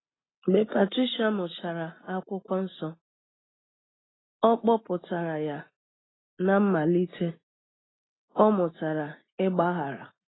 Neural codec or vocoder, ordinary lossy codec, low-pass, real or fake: none; AAC, 16 kbps; 7.2 kHz; real